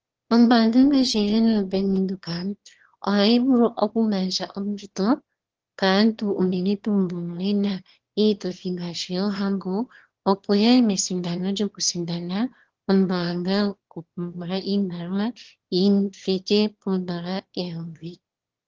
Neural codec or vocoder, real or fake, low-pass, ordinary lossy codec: autoencoder, 22.05 kHz, a latent of 192 numbers a frame, VITS, trained on one speaker; fake; 7.2 kHz; Opus, 16 kbps